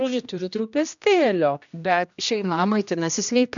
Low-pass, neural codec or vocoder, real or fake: 7.2 kHz; codec, 16 kHz, 1 kbps, X-Codec, HuBERT features, trained on general audio; fake